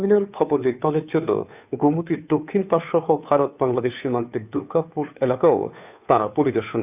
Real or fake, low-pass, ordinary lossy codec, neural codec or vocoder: fake; 3.6 kHz; none; codec, 16 kHz, 2 kbps, FunCodec, trained on Chinese and English, 25 frames a second